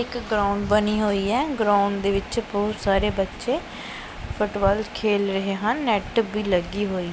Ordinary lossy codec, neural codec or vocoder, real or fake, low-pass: none; none; real; none